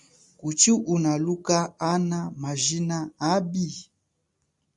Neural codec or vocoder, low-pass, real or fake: none; 10.8 kHz; real